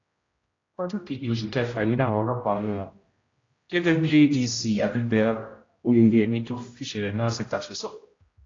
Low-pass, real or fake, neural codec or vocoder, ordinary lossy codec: 7.2 kHz; fake; codec, 16 kHz, 0.5 kbps, X-Codec, HuBERT features, trained on general audio; AAC, 32 kbps